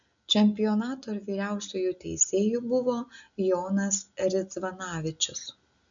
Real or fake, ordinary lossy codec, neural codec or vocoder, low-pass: real; MP3, 96 kbps; none; 7.2 kHz